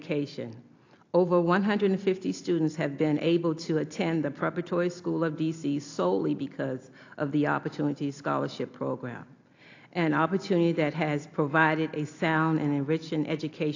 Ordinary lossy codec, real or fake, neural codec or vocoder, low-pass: AAC, 48 kbps; real; none; 7.2 kHz